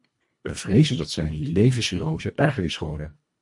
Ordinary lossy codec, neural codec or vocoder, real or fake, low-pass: MP3, 48 kbps; codec, 24 kHz, 1.5 kbps, HILCodec; fake; 10.8 kHz